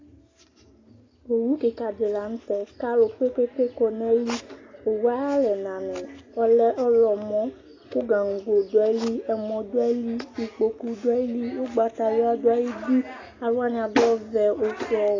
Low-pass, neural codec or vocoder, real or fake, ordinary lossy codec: 7.2 kHz; none; real; AAC, 32 kbps